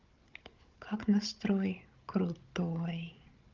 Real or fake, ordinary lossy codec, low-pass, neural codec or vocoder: fake; Opus, 24 kbps; 7.2 kHz; codec, 16 kHz, 16 kbps, FunCodec, trained on Chinese and English, 50 frames a second